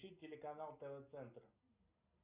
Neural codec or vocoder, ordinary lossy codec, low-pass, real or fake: none; Opus, 64 kbps; 3.6 kHz; real